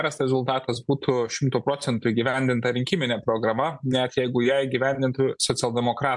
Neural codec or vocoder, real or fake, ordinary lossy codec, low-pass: none; real; MP3, 64 kbps; 10.8 kHz